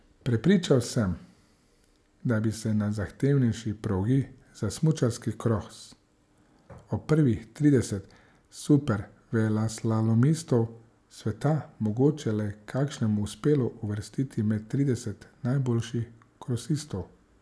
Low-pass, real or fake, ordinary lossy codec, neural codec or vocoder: none; real; none; none